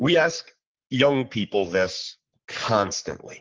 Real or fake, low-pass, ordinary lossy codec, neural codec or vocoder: fake; 7.2 kHz; Opus, 16 kbps; codec, 44.1 kHz, 3.4 kbps, Pupu-Codec